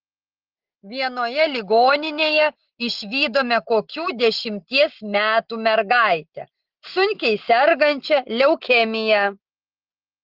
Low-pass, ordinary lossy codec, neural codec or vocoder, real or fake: 5.4 kHz; Opus, 16 kbps; none; real